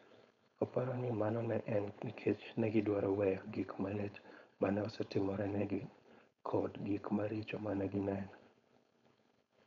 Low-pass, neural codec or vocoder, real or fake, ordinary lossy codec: 7.2 kHz; codec, 16 kHz, 4.8 kbps, FACodec; fake; none